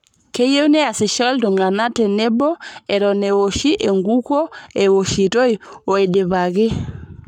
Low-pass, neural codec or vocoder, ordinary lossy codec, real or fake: 19.8 kHz; codec, 44.1 kHz, 7.8 kbps, Pupu-Codec; none; fake